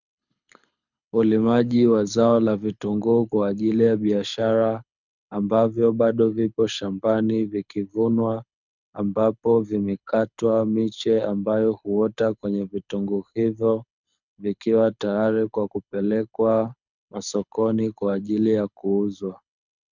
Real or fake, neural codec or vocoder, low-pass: fake; codec, 24 kHz, 6 kbps, HILCodec; 7.2 kHz